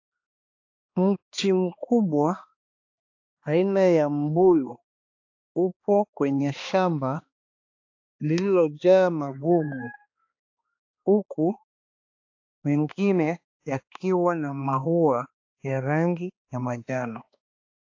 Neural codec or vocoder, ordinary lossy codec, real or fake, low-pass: codec, 16 kHz, 2 kbps, X-Codec, HuBERT features, trained on balanced general audio; AAC, 48 kbps; fake; 7.2 kHz